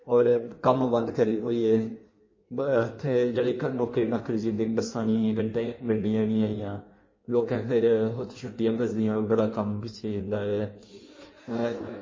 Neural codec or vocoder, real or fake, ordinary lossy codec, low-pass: codec, 16 kHz in and 24 kHz out, 1.1 kbps, FireRedTTS-2 codec; fake; MP3, 32 kbps; 7.2 kHz